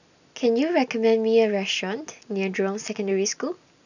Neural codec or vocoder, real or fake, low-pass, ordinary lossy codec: none; real; 7.2 kHz; none